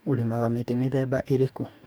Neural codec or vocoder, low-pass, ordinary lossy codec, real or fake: codec, 44.1 kHz, 2.6 kbps, DAC; none; none; fake